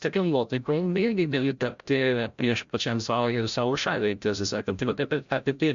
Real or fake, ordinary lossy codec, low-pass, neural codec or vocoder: fake; MP3, 64 kbps; 7.2 kHz; codec, 16 kHz, 0.5 kbps, FreqCodec, larger model